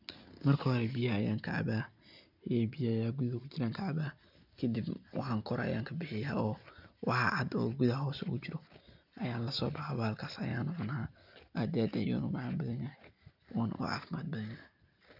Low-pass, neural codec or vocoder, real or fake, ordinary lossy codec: 5.4 kHz; none; real; none